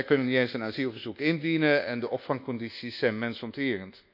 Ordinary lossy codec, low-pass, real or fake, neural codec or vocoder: none; 5.4 kHz; fake; codec, 24 kHz, 1.2 kbps, DualCodec